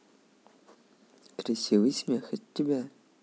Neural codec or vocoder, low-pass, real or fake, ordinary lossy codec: none; none; real; none